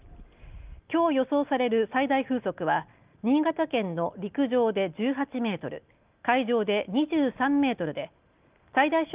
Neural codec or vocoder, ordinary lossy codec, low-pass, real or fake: none; Opus, 24 kbps; 3.6 kHz; real